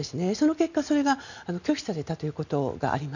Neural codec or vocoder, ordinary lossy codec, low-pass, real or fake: none; none; 7.2 kHz; real